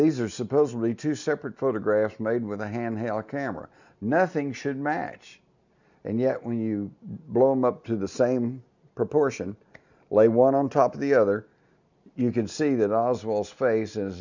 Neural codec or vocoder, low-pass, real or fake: none; 7.2 kHz; real